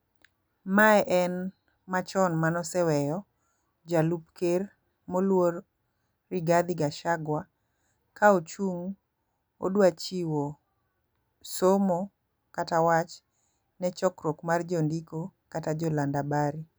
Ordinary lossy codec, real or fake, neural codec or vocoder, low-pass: none; real; none; none